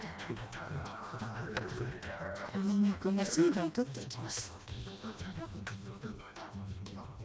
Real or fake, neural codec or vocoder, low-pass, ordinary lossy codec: fake; codec, 16 kHz, 1 kbps, FreqCodec, smaller model; none; none